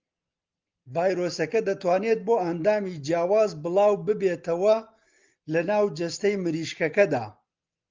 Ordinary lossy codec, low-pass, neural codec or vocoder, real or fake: Opus, 24 kbps; 7.2 kHz; none; real